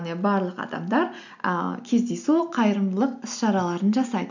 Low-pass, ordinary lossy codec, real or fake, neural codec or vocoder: 7.2 kHz; none; real; none